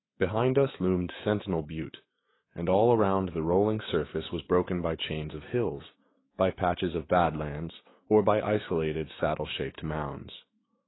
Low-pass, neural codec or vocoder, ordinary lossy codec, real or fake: 7.2 kHz; autoencoder, 48 kHz, 128 numbers a frame, DAC-VAE, trained on Japanese speech; AAC, 16 kbps; fake